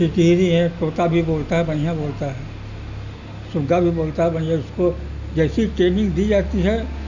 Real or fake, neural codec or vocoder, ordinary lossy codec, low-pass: real; none; none; 7.2 kHz